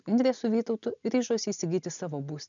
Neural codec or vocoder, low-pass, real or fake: none; 7.2 kHz; real